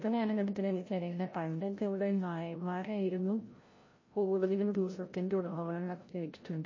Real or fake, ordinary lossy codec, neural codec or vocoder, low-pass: fake; MP3, 32 kbps; codec, 16 kHz, 0.5 kbps, FreqCodec, larger model; 7.2 kHz